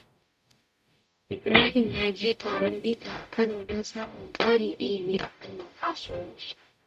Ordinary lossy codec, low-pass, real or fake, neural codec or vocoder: none; 14.4 kHz; fake; codec, 44.1 kHz, 0.9 kbps, DAC